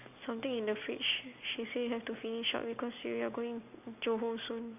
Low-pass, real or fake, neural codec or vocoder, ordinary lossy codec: 3.6 kHz; real; none; none